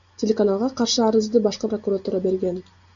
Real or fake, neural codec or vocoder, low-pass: real; none; 7.2 kHz